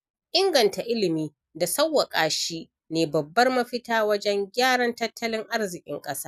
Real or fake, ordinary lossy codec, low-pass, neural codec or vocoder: real; none; 14.4 kHz; none